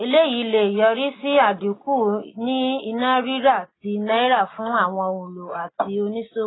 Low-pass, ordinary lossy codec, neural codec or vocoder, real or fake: 7.2 kHz; AAC, 16 kbps; none; real